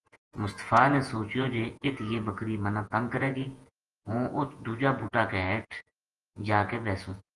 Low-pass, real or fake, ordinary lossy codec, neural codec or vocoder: 10.8 kHz; fake; Opus, 24 kbps; vocoder, 48 kHz, 128 mel bands, Vocos